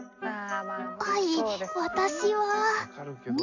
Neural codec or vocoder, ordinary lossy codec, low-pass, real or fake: none; AAC, 48 kbps; 7.2 kHz; real